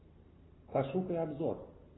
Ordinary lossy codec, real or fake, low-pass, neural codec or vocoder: AAC, 16 kbps; real; 7.2 kHz; none